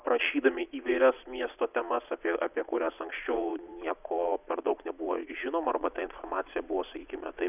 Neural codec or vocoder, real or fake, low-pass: vocoder, 22.05 kHz, 80 mel bands, Vocos; fake; 3.6 kHz